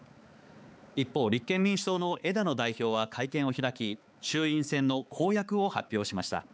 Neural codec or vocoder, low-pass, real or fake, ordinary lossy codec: codec, 16 kHz, 4 kbps, X-Codec, HuBERT features, trained on balanced general audio; none; fake; none